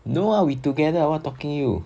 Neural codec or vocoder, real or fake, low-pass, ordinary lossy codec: none; real; none; none